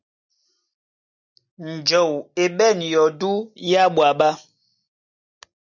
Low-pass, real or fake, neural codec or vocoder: 7.2 kHz; real; none